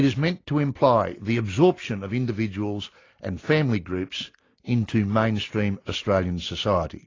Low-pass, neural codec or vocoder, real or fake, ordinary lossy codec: 7.2 kHz; none; real; AAC, 32 kbps